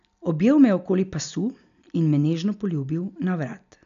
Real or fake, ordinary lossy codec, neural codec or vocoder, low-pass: real; none; none; 7.2 kHz